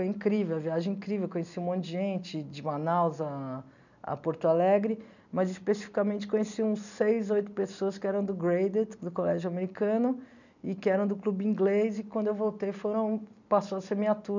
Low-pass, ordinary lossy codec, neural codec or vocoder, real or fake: 7.2 kHz; none; none; real